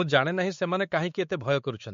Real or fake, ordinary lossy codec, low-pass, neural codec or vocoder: fake; MP3, 48 kbps; 7.2 kHz; codec, 16 kHz, 4 kbps, X-Codec, WavLM features, trained on Multilingual LibriSpeech